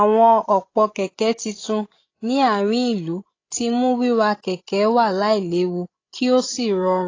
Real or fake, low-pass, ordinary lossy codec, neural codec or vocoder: fake; 7.2 kHz; AAC, 32 kbps; codec, 16 kHz, 16 kbps, FreqCodec, larger model